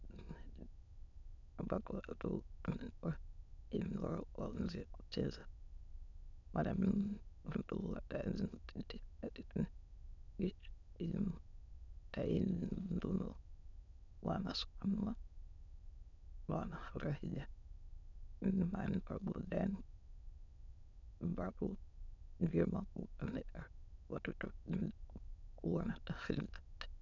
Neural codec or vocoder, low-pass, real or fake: autoencoder, 22.05 kHz, a latent of 192 numbers a frame, VITS, trained on many speakers; 7.2 kHz; fake